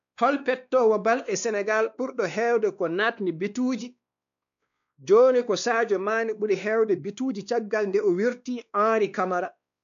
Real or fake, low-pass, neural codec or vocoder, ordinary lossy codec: fake; 7.2 kHz; codec, 16 kHz, 2 kbps, X-Codec, WavLM features, trained on Multilingual LibriSpeech; none